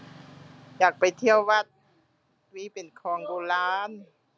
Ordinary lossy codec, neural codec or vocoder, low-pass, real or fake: none; none; none; real